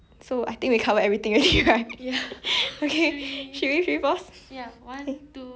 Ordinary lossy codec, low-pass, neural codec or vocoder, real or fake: none; none; none; real